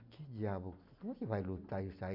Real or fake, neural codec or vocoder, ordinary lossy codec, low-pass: real; none; none; 5.4 kHz